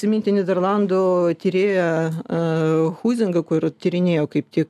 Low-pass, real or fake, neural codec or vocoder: 14.4 kHz; real; none